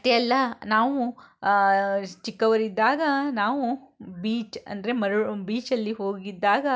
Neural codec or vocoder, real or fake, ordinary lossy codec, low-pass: none; real; none; none